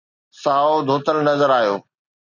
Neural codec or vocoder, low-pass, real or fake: none; 7.2 kHz; real